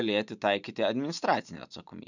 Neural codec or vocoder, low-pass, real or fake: vocoder, 44.1 kHz, 128 mel bands every 512 samples, BigVGAN v2; 7.2 kHz; fake